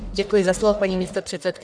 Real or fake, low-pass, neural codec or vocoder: fake; 9.9 kHz; codec, 44.1 kHz, 1.7 kbps, Pupu-Codec